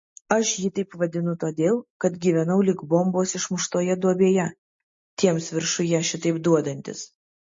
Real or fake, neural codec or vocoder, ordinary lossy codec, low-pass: real; none; MP3, 32 kbps; 7.2 kHz